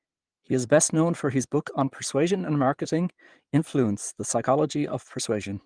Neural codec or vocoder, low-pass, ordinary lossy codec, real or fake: vocoder, 22.05 kHz, 80 mel bands, Vocos; 9.9 kHz; Opus, 24 kbps; fake